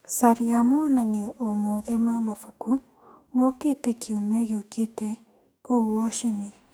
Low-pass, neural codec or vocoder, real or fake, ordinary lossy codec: none; codec, 44.1 kHz, 2.6 kbps, DAC; fake; none